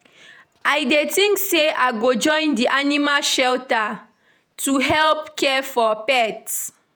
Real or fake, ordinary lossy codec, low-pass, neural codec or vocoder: fake; none; none; vocoder, 48 kHz, 128 mel bands, Vocos